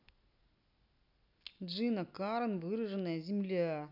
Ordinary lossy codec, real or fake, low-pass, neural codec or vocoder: none; real; 5.4 kHz; none